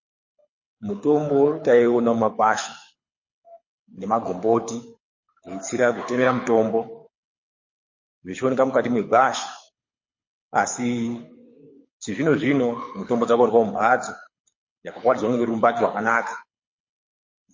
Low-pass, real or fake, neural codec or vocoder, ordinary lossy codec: 7.2 kHz; fake; codec, 24 kHz, 6 kbps, HILCodec; MP3, 32 kbps